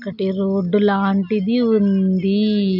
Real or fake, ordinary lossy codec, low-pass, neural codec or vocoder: real; none; 5.4 kHz; none